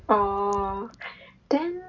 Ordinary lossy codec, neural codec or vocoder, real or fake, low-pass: none; none; real; 7.2 kHz